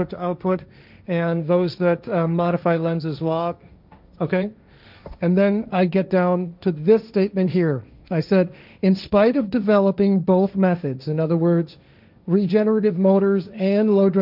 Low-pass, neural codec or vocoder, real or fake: 5.4 kHz; codec, 16 kHz, 1.1 kbps, Voila-Tokenizer; fake